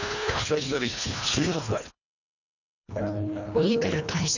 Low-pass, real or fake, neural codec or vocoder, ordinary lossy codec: 7.2 kHz; fake; codec, 24 kHz, 1.5 kbps, HILCodec; none